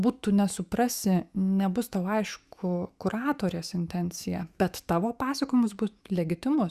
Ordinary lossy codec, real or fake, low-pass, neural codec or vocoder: Opus, 64 kbps; fake; 14.4 kHz; autoencoder, 48 kHz, 128 numbers a frame, DAC-VAE, trained on Japanese speech